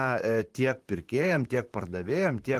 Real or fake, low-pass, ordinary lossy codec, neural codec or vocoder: fake; 14.4 kHz; Opus, 24 kbps; vocoder, 44.1 kHz, 128 mel bands, Pupu-Vocoder